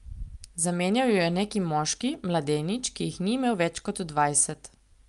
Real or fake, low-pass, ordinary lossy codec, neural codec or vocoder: real; 10.8 kHz; Opus, 32 kbps; none